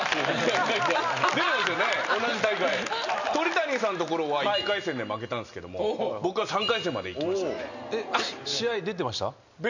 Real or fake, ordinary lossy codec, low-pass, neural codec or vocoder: real; none; 7.2 kHz; none